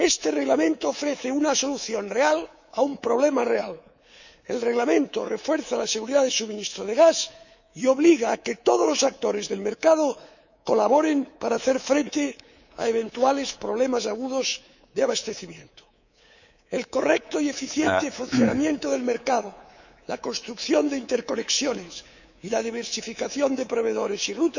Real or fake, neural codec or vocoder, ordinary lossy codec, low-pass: fake; codec, 24 kHz, 3.1 kbps, DualCodec; none; 7.2 kHz